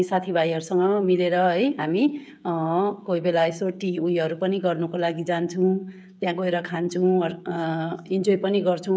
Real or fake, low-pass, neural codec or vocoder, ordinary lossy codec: fake; none; codec, 16 kHz, 16 kbps, FreqCodec, smaller model; none